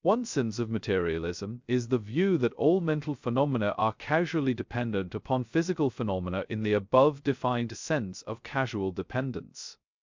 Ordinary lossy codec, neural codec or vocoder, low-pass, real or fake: MP3, 64 kbps; codec, 16 kHz, 0.2 kbps, FocalCodec; 7.2 kHz; fake